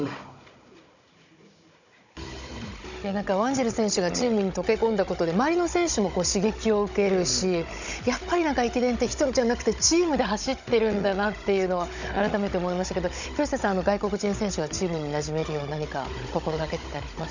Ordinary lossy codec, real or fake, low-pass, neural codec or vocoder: none; fake; 7.2 kHz; codec, 16 kHz, 16 kbps, FunCodec, trained on Chinese and English, 50 frames a second